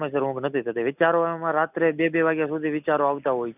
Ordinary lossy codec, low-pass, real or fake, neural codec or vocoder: none; 3.6 kHz; real; none